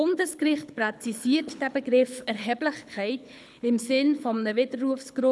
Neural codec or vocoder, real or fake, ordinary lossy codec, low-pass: codec, 24 kHz, 6 kbps, HILCodec; fake; none; none